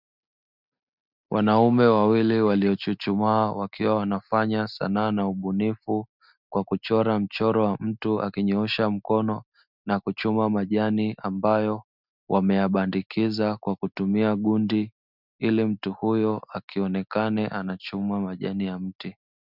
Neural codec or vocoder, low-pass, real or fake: none; 5.4 kHz; real